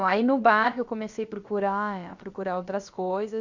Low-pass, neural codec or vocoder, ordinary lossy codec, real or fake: 7.2 kHz; codec, 16 kHz, about 1 kbps, DyCAST, with the encoder's durations; Opus, 64 kbps; fake